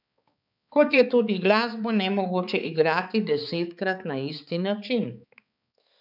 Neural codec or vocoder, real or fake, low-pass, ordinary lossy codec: codec, 16 kHz, 4 kbps, X-Codec, HuBERT features, trained on balanced general audio; fake; 5.4 kHz; none